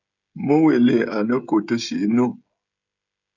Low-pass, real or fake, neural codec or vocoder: 7.2 kHz; fake; codec, 16 kHz, 16 kbps, FreqCodec, smaller model